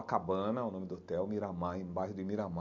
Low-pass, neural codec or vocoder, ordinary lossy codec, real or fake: 7.2 kHz; none; MP3, 48 kbps; real